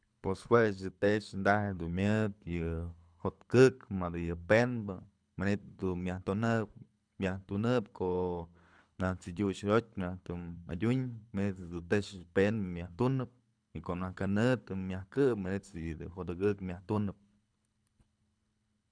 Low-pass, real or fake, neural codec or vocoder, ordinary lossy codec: 9.9 kHz; fake; codec, 24 kHz, 6 kbps, HILCodec; none